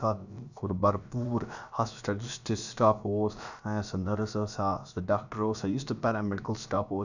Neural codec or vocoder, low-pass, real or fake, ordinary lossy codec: codec, 16 kHz, about 1 kbps, DyCAST, with the encoder's durations; 7.2 kHz; fake; none